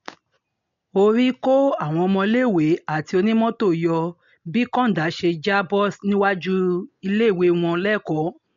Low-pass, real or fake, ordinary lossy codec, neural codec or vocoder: 7.2 kHz; real; MP3, 48 kbps; none